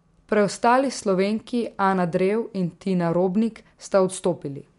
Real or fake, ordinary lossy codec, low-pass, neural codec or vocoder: real; MP3, 64 kbps; 10.8 kHz; none